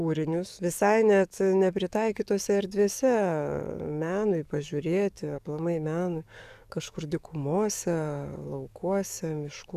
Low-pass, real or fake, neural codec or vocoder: 14.4 kHz; fake; codec, 44.1 kHz, 7.8 kbps, DAC